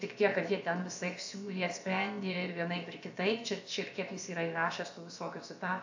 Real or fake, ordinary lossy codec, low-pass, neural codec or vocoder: fake; AAC, 48 kbps; 7.2 kHz; codec, 16 kHz, about 1 kbps, DyCAST, with the encoder's durations